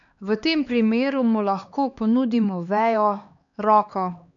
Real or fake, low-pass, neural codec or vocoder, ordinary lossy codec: fake; 7.2 kHz; codec, 16 kHz, 2 kbps, X-Codec, HuBERT features, trained on LibriSpeech; none